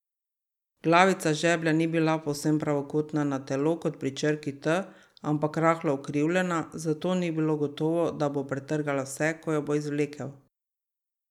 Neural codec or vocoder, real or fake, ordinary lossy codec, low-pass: none; real; none; 19.8 kHz